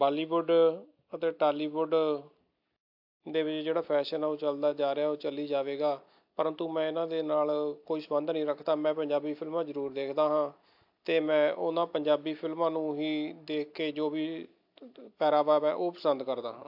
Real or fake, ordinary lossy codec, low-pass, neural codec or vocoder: real; AAC, 48 kbps; 5.4 kHz; none